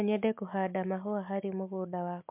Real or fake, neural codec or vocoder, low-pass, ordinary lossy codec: real; none; 3.6 kHz; MP3, 32 kbps